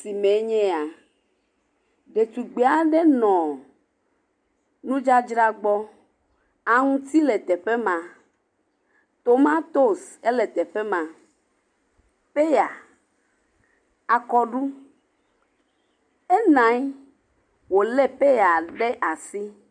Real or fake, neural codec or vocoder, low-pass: real; none; 9.9 kHz